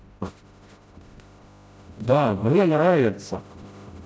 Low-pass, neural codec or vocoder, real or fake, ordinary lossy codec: none; codec, 16 kHz, 0.5 kbps, FreqCodec, smaller model; fake; none